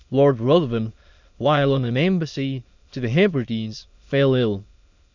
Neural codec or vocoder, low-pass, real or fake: autoencoder, 22.05 kHz, a latent of 192 numbers a frame, VITS, trained on many speakers; 7.2 kHz; fake